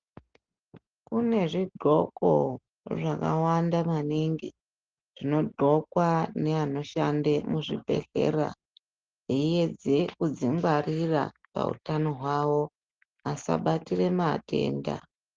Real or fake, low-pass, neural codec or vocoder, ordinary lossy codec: real; 7.2 kHz; none; Opus, 16 kbps